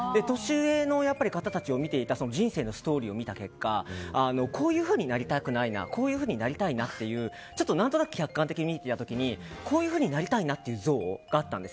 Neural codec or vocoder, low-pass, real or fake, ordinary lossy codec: none; none; real; none